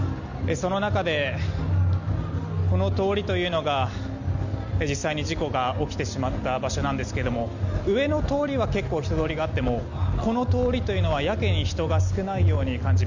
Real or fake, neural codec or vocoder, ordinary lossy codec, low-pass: real; none; none; 7.2 kHz